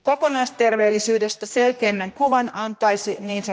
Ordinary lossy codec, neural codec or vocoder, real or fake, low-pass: none; codec, 16 kHz, 1 kbps, X-Codec, HuBERT features, trained on general audio; fake; none